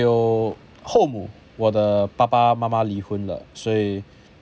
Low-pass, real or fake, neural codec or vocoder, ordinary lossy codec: none; real; none; none